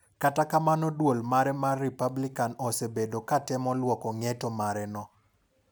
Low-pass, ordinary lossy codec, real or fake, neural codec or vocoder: none; none; real; none